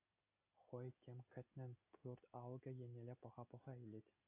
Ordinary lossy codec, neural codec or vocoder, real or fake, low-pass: AAC, 24 kbps; none; real; 3.6 kHz